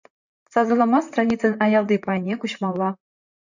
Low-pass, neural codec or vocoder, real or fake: 7.2 kHz; vocoder, 44.1 kHz, 128 mel bands, Pupu-Vocoder; fake